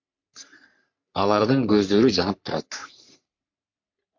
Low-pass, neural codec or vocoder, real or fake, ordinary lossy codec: 7.2 kHz; codec, 44.1 kHz, 3.4 kbps, Pupu-Codec; fake; MP3, 48 kbps